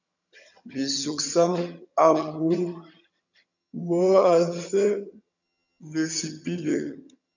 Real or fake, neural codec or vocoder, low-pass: fake; vocoder, 22.05 kHz, 80 mel bands, HiFi-GAN; 7.2 kHz